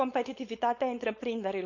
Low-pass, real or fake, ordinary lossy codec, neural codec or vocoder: 7.2 kHz; fake; none; codec, 16 kHz, 4.8 kbps, FACodec